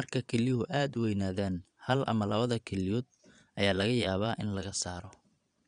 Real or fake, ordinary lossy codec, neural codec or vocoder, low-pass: fake; none; vocoder, 22.05 kHz, 80 mel bands, Vocos; 9.9 kHz